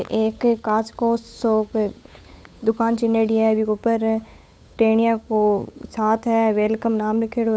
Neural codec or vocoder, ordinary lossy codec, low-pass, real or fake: codec, 16 kHz, 8 kbps, FunCodec, trained on Chinese and English, 25 frames a second; none; none; fake